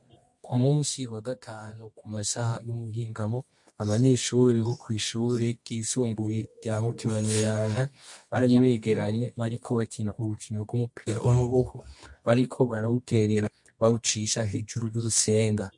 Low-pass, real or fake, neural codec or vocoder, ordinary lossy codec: 10.8 kHz; fake; codec, 24 kHz, 0.9 kbps, WavTokenizer, medium music audio release; MP3, 48 kbps